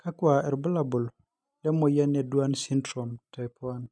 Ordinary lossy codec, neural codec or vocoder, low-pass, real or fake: none; none; none; real